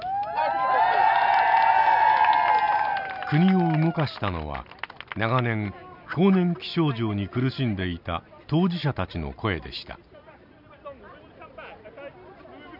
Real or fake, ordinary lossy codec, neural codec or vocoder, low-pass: real; none; none; 5.4 kHz